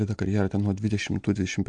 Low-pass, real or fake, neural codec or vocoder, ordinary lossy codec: 9.9 kHz; real; none; MP3, 64 kbps